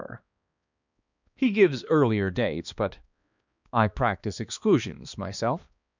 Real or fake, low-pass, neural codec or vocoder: fake; 7.2 kHz; codec, 16 kHz, 2 kbps, X-Codec, HuBERT features, trained on balanced general audio